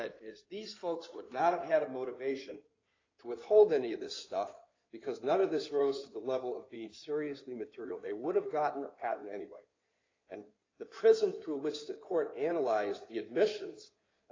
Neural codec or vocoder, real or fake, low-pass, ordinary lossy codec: codec, 16 kHz in and 24 kHz out, 2.2 kbps, FireRedTTS-2 codec; fake; 7.2 kHz; AAC, 32 kbps